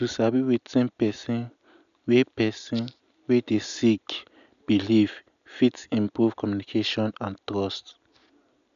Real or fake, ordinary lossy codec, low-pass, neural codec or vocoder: real; MP3, 96 kbps; 7.2 kHz; none